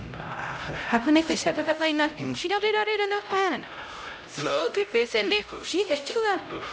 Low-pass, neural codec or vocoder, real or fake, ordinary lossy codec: none; codec, 16 kHz, 0.5 kbps, X-Codec, HuBERT features, trained on LibriSpeech; fake; none